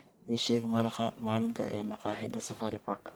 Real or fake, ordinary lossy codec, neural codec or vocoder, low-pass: fake; none; codec, 44.1 kHz, 1.7 kbps, Pupu-Codec; none